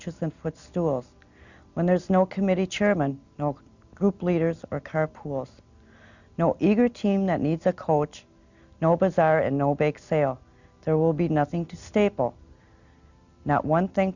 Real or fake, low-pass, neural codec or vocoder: real; 7.2 kHz; none